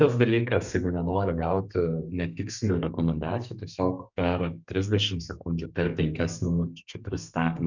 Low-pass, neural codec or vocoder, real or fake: 7.2 kHz; codec, 32 kHz, 1.9 kbps, SNAC; fake